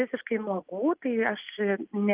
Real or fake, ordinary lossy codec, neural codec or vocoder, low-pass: real; Opus, 32 kbps; none; 3.6 kHz